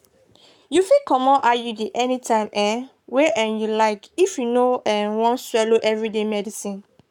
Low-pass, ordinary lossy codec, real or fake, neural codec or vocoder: 19.8 kHz; none; fake; codec, 44.1 kHz, 7.8 kbps, Pupu-Codec